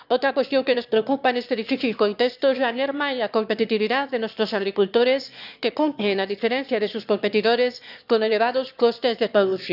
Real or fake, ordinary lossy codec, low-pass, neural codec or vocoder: fake; none; 5.4 kHz; autoencoder, 22.05 kHz, a latent of 192 numbers a frame, VITS, trained on one speaker